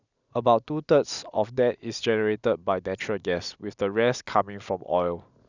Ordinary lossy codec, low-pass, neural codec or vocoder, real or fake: none; 7.2 kHz; none; real